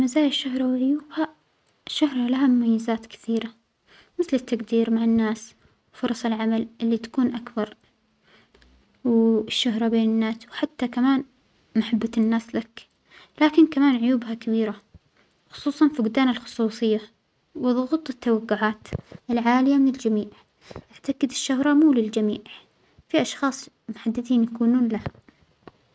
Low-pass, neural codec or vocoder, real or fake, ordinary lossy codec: none; none; real; none